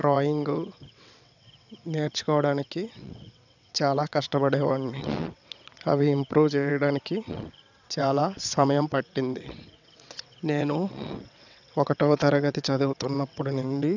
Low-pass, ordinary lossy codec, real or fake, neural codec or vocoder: 7.2 kHz; none; fake; vocoder, 22.05 kHz, 80 mel bands, WaveNeXt